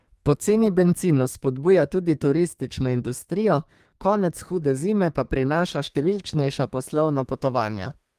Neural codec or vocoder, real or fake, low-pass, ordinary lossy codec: codec, 32 kHz, 1.9 kbps, SNAC; fake; 14.4 kHz; Opus, 32 kbps